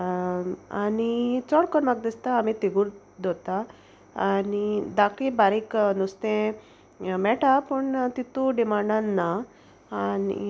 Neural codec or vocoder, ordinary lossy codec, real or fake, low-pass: none; none; real; none